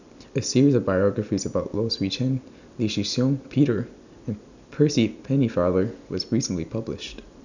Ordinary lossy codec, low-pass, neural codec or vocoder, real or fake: none; 7.2 kHz; none; real